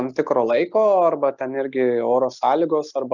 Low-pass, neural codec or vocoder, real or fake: 7.2 kHz; none; real